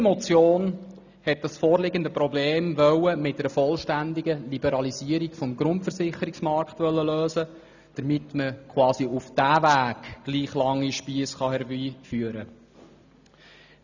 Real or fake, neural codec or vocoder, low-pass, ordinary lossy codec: real; none; 7.2 kHz; none